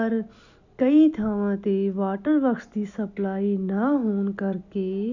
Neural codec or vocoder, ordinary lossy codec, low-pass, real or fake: none; none; 7.2 kHz; real